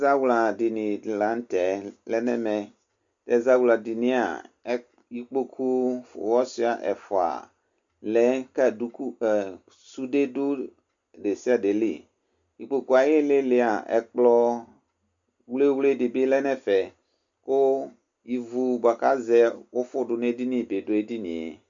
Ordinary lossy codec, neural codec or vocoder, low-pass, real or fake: MP3, 64 kbps; none; 7.2 kHz; real